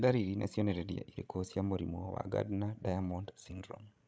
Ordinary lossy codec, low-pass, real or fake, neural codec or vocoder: none; none; fake; codec, 16 kHz, 16 kbps, FreqCodec, larger model